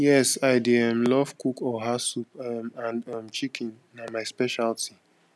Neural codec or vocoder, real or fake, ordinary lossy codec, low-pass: none; real; none; none